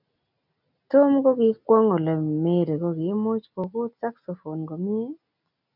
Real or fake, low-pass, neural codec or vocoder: real; 5.4 kHz; none